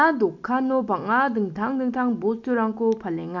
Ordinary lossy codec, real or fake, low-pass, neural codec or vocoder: none; real; 7.2 kHz; none